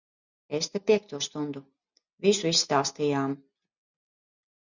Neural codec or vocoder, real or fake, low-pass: none; real; 7.2 kHz